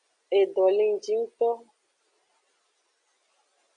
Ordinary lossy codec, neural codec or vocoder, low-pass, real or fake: Opus, 64 kbps; none; 9.9 kHz; real